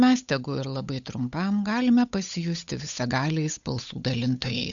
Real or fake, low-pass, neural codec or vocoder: fake; 7.2 kHz; codec, 16 kHz, 8 kbps, FunCodec, trained on Chinese and English, 25 frames a second